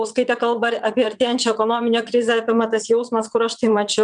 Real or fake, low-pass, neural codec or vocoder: fake; 9.9 kHz; vocoder, 22.05 kHz, 80 mel bands, WaveNeXt